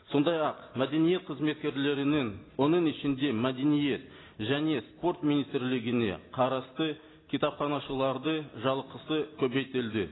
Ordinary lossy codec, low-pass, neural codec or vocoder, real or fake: AAC, 16 kbps; 7.2 kHz; none; real